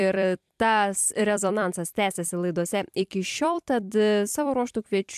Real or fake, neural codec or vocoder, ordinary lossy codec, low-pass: fake; vocoder, 44.1 kHz, 128 mel bands every 256 samples, BigVGAN v2; AAC, 96 kbps; 14.4 kHz